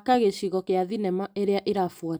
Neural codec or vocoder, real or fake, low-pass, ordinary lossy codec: none; real; none; none